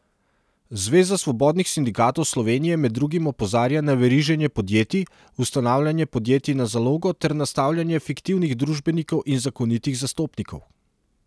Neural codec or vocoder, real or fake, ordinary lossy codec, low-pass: none; real; none; none